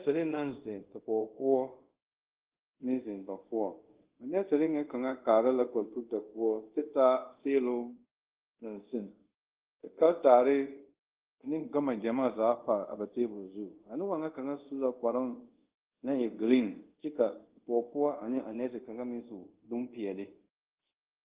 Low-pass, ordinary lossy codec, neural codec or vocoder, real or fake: 3.6 kHz; Opus, 16 kbps; codec, 24 kHz, 0.5 kbps, DualCodec; fake